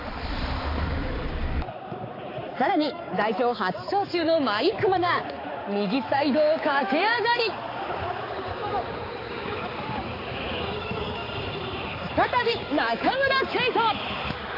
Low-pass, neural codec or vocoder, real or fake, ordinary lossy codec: 5.4 kHz; codec, 16 kHz, 4 kbps, X-Codec, HuBERT features, trained on balanced general audio; fake; AAC, 24 kbps